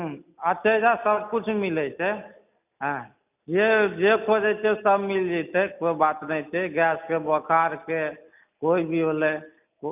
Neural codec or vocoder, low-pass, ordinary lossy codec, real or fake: none; 3.6 kHz; none; real